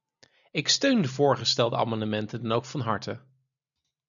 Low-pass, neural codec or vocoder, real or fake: 7.2 kHz; none; real